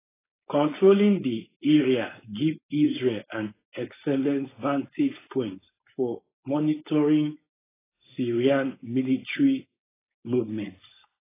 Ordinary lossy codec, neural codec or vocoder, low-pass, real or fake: AAC, 16 kbps; codec, 16 kHz, 4.8 kbps, FACodec; 3.6 kHz; fake